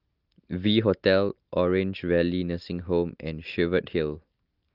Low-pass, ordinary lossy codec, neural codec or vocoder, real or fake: 5.4 kHz; Opus, 32 kbps; none; real